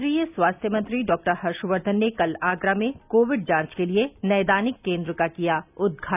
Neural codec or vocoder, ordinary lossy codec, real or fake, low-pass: none; none; real; 3.6 kHz